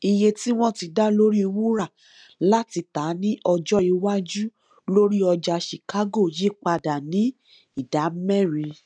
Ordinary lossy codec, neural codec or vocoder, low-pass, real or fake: none; none; 9.9 kHz; real